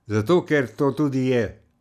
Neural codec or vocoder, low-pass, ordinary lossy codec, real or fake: none; 14.4 kHz; none; real